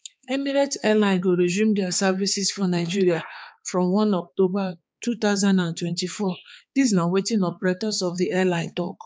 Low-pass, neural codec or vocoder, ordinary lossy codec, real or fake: none; codec, 16 kHz, 4 kbps, X-Codec, HuBERT features, trained on balanced general audio; none; fake